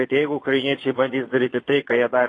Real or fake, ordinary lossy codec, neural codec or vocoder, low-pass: fake; AAC, 32 kbps; vocoder, 22.05 kHz, 80 mel bands, Vocos; 9.9 kHz